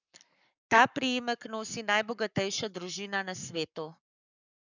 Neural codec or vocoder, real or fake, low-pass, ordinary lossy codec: codec, 44.1 kHz, 7.8 kbps, Pupu-Codec; fake; 7.2 kHz; none